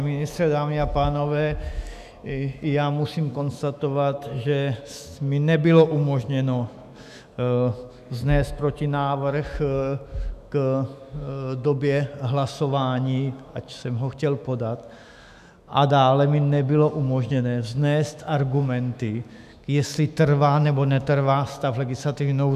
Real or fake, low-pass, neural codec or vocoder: fake; 14.4 kHz; autoencoder, 48 kHz, 128 numbers a frame, DAC-VAE, trained on Japanese speech